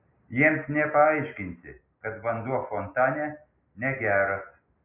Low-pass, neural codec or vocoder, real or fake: 3.6 kHz; none; real